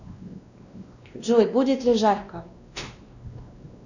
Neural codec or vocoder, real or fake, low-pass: codec, 16 kHz, 1 kbps, X-Codec, WavLM features, trained on Multilingual LibriSpeech; fake; 7.2 kHz